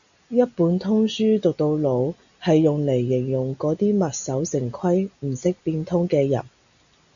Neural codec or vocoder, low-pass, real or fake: none; 7.2 kHz; real